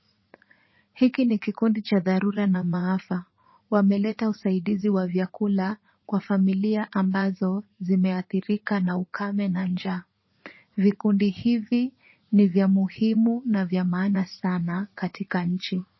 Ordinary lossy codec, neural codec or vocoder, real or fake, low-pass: MP3, 24 kbps; vocoder, 44.1 kHz, 80 mel bands, Vocos; fake; 7.2 kHz